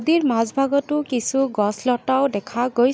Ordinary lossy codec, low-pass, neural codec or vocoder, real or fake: none; none; none; real